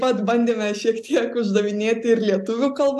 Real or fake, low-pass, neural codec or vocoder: real; 14.4 kHz; none